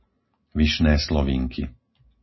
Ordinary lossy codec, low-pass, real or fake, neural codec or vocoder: MP3, 24 kbps; 7.2 kHz; real; none